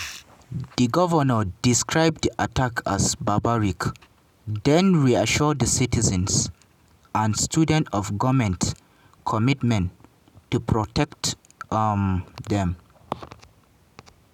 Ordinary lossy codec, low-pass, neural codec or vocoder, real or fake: none; 19.8 kHz; none; real